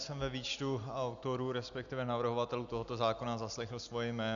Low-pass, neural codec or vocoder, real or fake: 7.2 kHz; none; real